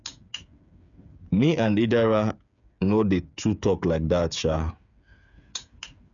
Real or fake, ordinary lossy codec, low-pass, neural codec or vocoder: fake; none; 7.2 kHz; codec, 16 kHz, 8 kbps, FreqCodec, smaller model